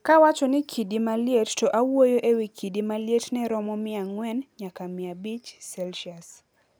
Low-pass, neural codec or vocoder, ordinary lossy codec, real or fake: none; none; none; real